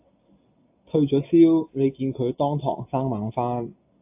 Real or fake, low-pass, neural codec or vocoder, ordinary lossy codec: real; 3.6 kHz; none; AAC, 32 kbps